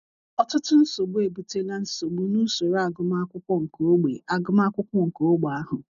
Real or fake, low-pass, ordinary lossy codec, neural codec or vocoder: real; 7.2 kHz; none; none